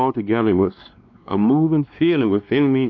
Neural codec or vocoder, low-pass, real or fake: codec, 16 kHz, 2 kbps, X-Codec, WavLM features, trained on Multilingual LibriSpeech; 7.2 kHz; fake